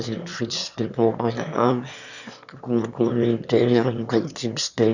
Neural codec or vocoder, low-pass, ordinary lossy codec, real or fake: autoencoder, 22.05 kHz, a latent of 192 numbers a frame, VITS, trained on one speaker; 7.2 kHz; none; fake